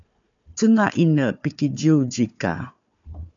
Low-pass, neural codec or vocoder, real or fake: 7.2 kHz; codec, 16 kHz, 4 kbps, FunCodec, trained on Chinese and English, 50 frames a second; fake